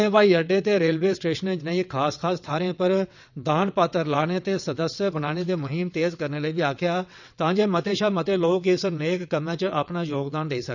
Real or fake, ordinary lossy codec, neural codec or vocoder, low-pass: fake; none; vocoder, 22.05 kHz, 80 mel bands, WaveNeXt; 7.2 kHz